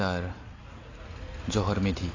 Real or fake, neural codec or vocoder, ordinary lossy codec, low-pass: real; none; AAC, 32 kbps; 7.2 kHz